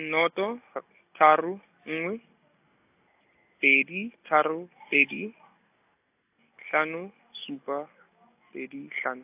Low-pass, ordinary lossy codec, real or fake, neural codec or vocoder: 3.6 kHz; AAC, 32 kbps; real; none